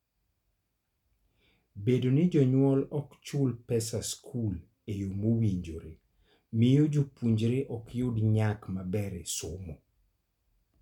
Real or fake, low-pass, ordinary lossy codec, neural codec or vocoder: real; 19.8 kHz; none; none